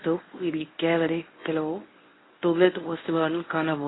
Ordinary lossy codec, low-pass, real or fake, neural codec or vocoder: AAC, 16 kbps; 7.2 kHz; fake; codec, 24 kHz, 0.9 kbps, WavTokenizer, medium speech release version 1